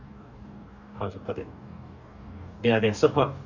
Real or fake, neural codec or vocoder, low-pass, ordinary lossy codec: fake; codec, 44.1 kHz, 2.6 kbps, DAC; 7.2 kHz; none